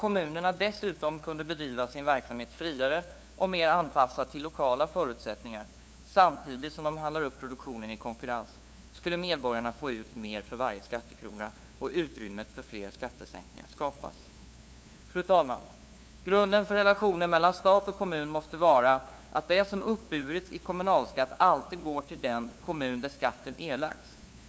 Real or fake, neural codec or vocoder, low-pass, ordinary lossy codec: fake; codec, 16 kHz, 2 kbps, FunCodec, trained on LibriTTS, 25 frames a second; none; none